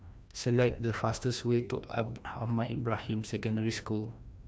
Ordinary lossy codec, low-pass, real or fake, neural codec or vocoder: none; none; fake; codec, 16 kHz, 1 kbps, FreqCodec, larger model